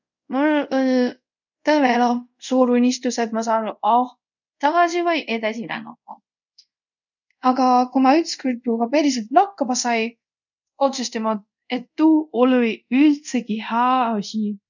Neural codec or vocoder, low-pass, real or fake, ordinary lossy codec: codec, 24 kHz, 0.5 kbps, DualCodec; 7.2 kHz; fake; none